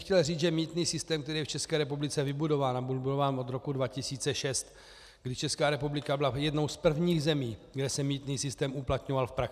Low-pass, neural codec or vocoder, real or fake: 14.4 kHz; none; real